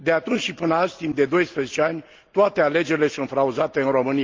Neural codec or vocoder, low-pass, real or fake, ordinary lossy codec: none; 7.2 kHz; real; Opus, 32 kbps